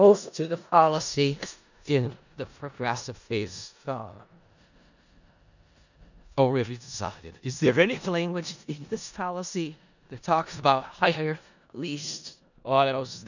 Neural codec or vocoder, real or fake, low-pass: codec, 16 kHz in and 24 kHz out, 0.4 kbps, LongCat-Audio-Codec, four codebook decoder; fake; 7.2 kHz